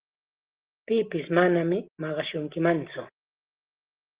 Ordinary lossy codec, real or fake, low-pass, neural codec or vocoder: Opus, 16 kbps; real; 3.6 kHz; none